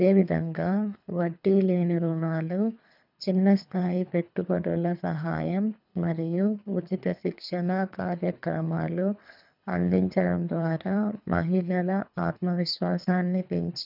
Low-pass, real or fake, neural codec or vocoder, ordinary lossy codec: 5.4 kHz; fake; codec, 24 kHz, 3 kbps, HILCodec; none